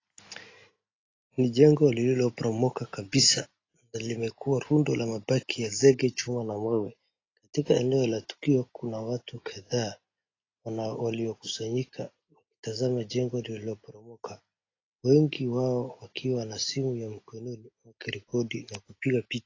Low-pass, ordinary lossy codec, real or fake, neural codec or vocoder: 7.2 kHz; AAC, 32 kbps; real; none